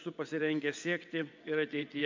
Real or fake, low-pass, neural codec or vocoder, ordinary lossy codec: fake; 7.2 kHz; vocoder, 22.05 kHz, 80 mel bands, WaveNeXt; MP3, 64 kbps